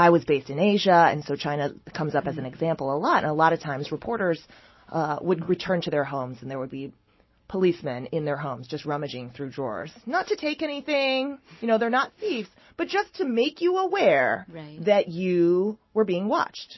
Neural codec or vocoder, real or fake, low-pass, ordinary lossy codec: none; real; 7.2 kHz; MP3, 24 kbps